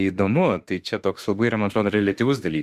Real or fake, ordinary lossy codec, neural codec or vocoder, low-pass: fake; Opus, 64 kbps; autoencoder, 48 kHz, 32 numbers a frame, DAC-VAE, trained on Japanese speech; 14.4 kHz